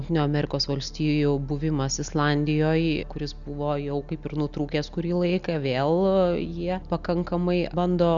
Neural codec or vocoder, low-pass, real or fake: none; 7.2 kHz; real